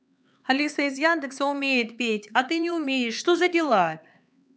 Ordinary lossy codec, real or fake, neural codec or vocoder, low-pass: none; fake; codec, 16 kHz, 4 kbps, X-Codec, HuBERT features, trained on LibriSpeech; none